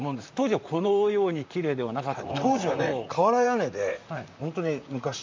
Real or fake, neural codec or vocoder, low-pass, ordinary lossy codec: fake; vocoder, 44.1 kHz, 128 mel bands, Pupu-Vocoder; 7.2 kHz; MP3, 64 kbps